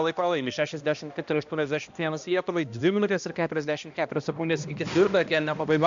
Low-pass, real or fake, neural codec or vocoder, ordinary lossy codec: 7.2 kHz; fake; codec, 16 kHz, 1 kbps, X-Codec, HuBERT features, trained on balanced general audio; MP3, 64 kbps